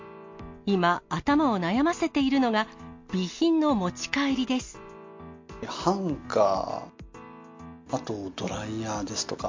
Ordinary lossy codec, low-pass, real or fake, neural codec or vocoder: MP3, 48 kbps; 7.2 kHz; real; none